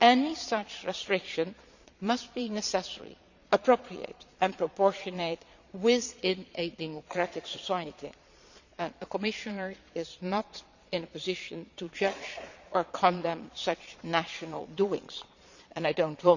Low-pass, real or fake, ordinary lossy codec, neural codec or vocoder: 7.2 kHz; fake; none; vocoder, 22.05 kHz, 80 mel bands, Vocos